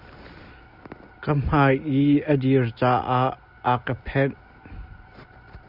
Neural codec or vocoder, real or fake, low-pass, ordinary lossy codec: none; real; 5.4 kHz; Opus, 64 kbps